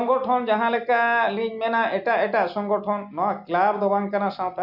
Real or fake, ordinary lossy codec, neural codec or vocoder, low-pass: real; none; none; 5.4 kHz